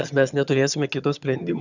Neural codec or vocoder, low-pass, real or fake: vocoder, 22.05 kHz, 80 mel bands, HiFi-GAN; 7.2 kHz; fake